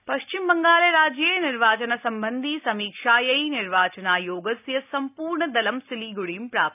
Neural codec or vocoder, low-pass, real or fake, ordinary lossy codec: none; 3.6 kHz; real; none